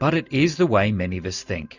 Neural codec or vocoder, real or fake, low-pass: none; real; 7.2 kHz